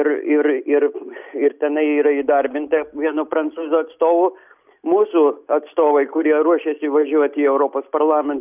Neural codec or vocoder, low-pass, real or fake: none; 3.6 kHz; real